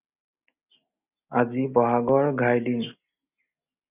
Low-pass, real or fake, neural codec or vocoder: 3.6 kHz; real; none